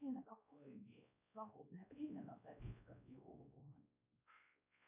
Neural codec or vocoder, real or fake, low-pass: codec, 16 kHz, 1 kbps, X-Codec, WavLM features, trained on Multilingual LibriSpeech; fake; 3.6 kHz